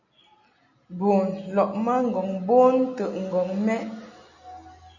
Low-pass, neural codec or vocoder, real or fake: 7.2 kHz; none; real